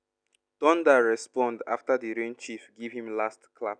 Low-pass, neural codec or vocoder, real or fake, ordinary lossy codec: 9.9 kHz; none; real; none